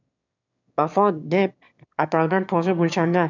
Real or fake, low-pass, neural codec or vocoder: fake; 7.2 kHz; autoencoder, 22.05 kHz, a latent of 192 numbers a frame, VITS, trained on one speaker